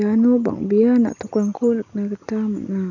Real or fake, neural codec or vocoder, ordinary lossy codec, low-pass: real; none; none; 7.2 kHz